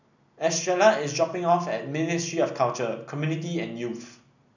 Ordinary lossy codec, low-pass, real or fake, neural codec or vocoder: none; 7.2 kHz; real; none